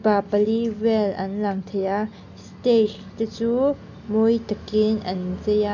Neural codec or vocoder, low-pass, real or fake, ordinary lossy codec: none; 7.2 kHz; real; none